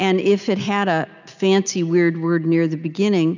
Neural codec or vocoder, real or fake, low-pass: none; real; 7.2 kHz